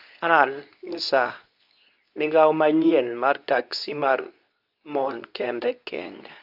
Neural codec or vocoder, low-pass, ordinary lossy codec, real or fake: codec, 24 kHz, 0.9 kbps, WavTokenizer, medium speech release version 2; 5.4 kHz; none; fake